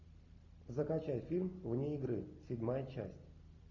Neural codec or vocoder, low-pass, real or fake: none; 7.2 kHz; real